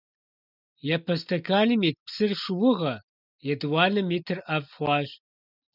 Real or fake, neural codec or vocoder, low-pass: real; none; 5.4 kHz